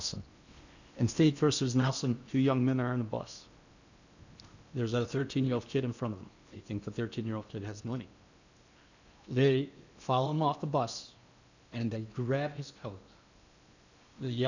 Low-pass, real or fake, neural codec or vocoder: 7.2 kHz; fake; codec, 16 kHz in and 24 kHz out, 0.8 kbps, FocalCodec, streaming, 65536 codes